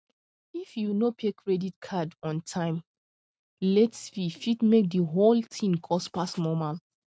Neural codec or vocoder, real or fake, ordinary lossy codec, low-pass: none; real; none; none